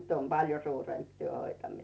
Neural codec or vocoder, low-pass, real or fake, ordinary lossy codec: none; none; real; none